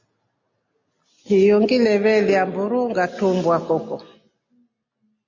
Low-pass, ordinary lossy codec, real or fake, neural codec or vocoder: 7.2 kHz; MP3, 32 kbps; real; none